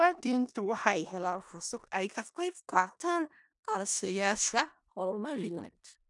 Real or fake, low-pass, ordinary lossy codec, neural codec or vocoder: fake; 10.8 kHz; none; codec, 16 kHz in and 24 kHz out, 0.4 kbps, LongCat-Audio-Codec, four codebook decoder